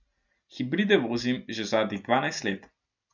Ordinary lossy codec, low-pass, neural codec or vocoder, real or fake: none; 7.2 kHz; none; real